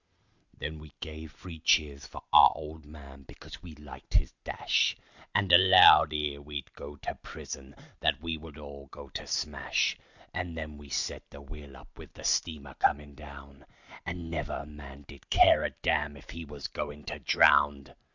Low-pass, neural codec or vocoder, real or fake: 7.2 kHz; none; real